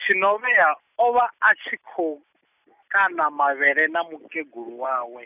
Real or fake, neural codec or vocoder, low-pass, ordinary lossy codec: real; none; 3.6 kHz; none